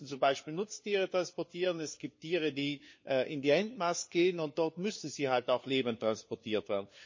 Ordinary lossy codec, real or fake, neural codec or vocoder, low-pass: MP3, 32 kbps; fake; codec, 16 kHz, 4 kbps, FunCodec, trained on LibriTTS, 50 frames a second; 7.2 kHz